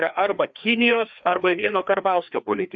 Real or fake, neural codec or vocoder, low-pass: fake; codec, 16 kHz, 2 kbps, FreqCodec, larger model; 7.2 kHz